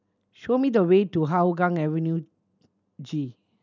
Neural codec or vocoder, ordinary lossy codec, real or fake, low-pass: none; none; real; 7.2 kHz